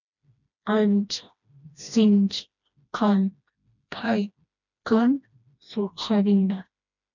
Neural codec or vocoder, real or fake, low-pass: codec, 16 kHz, 1 kbps, FreqCodec, smaller model; fake; 7.2 kHz